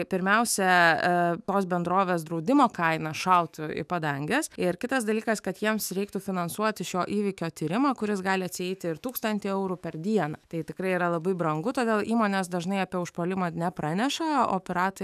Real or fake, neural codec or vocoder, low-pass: fake; autoencoder, 48 kHz, 128 numbers a frame, DAC-VAE, trained on Japanese speech; 14.4 kHz